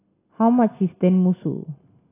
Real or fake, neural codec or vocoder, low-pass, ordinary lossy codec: real; none; 3.6 kHz; AAC, 24 kbps